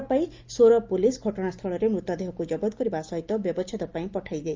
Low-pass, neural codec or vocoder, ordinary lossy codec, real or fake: 7.2 kHz; none; Opus, 32 kbps; real